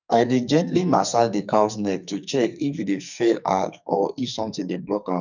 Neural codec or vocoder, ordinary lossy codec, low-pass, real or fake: codec, 44.1 kHz, 2.6 kbps, SNAC; none; 7.2 kHz; fake